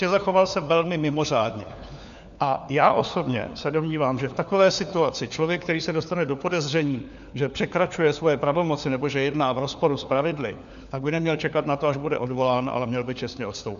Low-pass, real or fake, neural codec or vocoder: 7.2 kHz; fake; codec, 16 kHz, 4 kbps, FunCodec, trained on LibriTTS, 50 frames a second